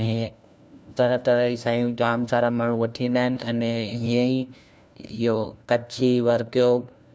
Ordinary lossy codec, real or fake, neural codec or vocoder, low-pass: none; fake; codec, 16 kHz, 1 kbps, FunCodec, trained on LibriTTS, 50 frames a second; none